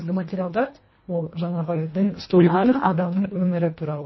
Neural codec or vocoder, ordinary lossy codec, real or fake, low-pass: codec, 24 kHz, 1.5 kbps, HILCodec; MP3, 24 kbps; fake; 7.2 kHz